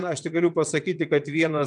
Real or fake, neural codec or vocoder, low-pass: fake; vocoder, 22.05 kHz, 80 mel bands, WaveNeXt; 9.9 kHz